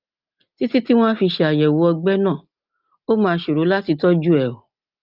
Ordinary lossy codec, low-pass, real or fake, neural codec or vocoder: Opus, 32 kbps; 5.4 kHz; real; none